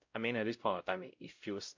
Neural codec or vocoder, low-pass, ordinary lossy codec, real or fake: codec, 16 kHz, 0.5 kbps, X-Codec, WavLM features, trained on Multilingual LibriSpeech; 7.2 kHz; MP3, 48 kbps; fake